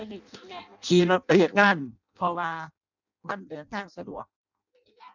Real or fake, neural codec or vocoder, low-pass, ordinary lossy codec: fake; codec, 16 kHz in and 24 kHz out, 0.6 kbps, FireRedTTS-2 codec; 7.2 kHz; none